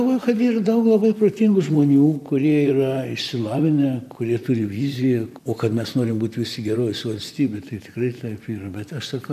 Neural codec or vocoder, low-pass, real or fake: vocoder, 44.1 kHz, 128 mel bands, Pupu-Vocoder; 14.4 kHz; fake